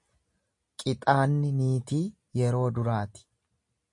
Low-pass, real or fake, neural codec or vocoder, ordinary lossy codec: 10.8 kHz; real; none; MP3, 48 kbps